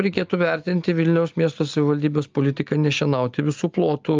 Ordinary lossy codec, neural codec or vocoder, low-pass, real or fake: Opus, 24 kbps; none; 7.2 kHz; real